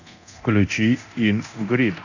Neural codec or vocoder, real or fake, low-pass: codec, 24 kHz, 0.9 kbps, DualCodec; fake; 7.2 kHz